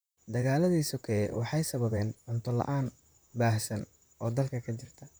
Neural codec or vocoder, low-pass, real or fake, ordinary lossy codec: vocoder, 44.1 kHz, 128 mel bands, Pupu-Vocoder; none; fake; none